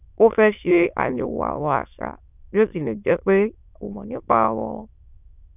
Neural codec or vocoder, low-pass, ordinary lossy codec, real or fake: autoencoder, 22.05 kHz, a latent of 192 numbers a frame, VITS, trained on many speakers; 3.6 kHz; none; fake